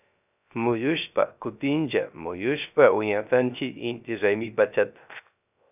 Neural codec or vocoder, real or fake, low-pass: codec, 16 kHz, 0.3 kbps, FocalCodec; fake; 3.6 kHz